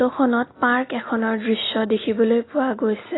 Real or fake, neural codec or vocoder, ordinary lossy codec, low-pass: real; none; AAC, 16 kbps; 7.2 kHz